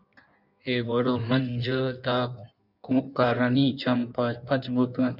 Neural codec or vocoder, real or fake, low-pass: codec, 16 kHz in and 24 kHz out, 1.1 kbps, FireRedTTS-2 codec; fake; 5.4 kHz